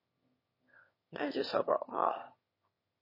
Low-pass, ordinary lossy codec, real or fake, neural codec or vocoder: 5.4 kHz; MP3, 24 kbps; fake; autoencoder, 22.05 kHz, a latent of 192 numbers a frame, VITS, trained on one speaker